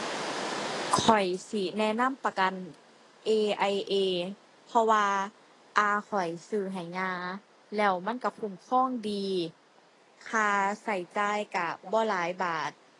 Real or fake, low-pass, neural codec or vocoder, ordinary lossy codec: real; 10.8 kHz; none; AAC, 32 kbps